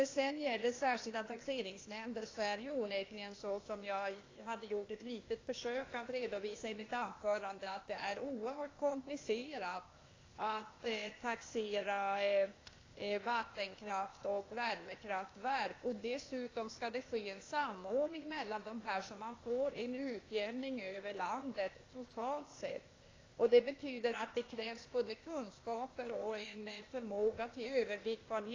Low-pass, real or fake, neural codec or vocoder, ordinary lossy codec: 7.2 kHz; fake; codec, 16 kHz, 0.8 kbps, ZipCodec; AAC, 32 kbps